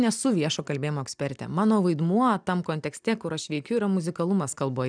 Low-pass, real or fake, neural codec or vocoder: 9.9 kHz; real; none